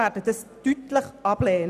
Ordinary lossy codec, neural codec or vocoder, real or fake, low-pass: none; none; real; 14.4 kHz